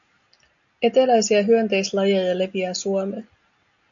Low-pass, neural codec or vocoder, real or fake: 7.2 kHz; none; real